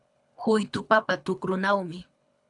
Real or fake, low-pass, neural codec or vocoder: fake; 10.8 kHz; codec, 24 kHz, 3 kbps, HILCodec